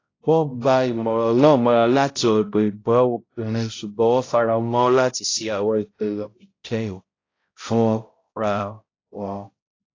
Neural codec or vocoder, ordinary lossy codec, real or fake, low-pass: codec, 16 kHz, 0.5 kbps, X-Codec, HuBERT features, trained on balanced general audio; AAC, 32 kbps; fake; 7.2 kHz